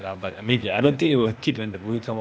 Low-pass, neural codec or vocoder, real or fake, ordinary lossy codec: none; codec, 16 kHz, 0.8 kbps, ZipCodec; fake; none